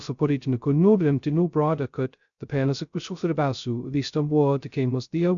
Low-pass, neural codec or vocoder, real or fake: 7.2 kHz; codec, 16 kHz, 0.2 kbps, FocalCodec; fake